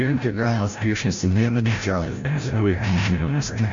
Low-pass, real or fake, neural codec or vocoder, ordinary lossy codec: 7.2 kHz; fake; codec, 16 kHz, 0.5 kbps, FreqCodec, larger model; MP3, 48 kbps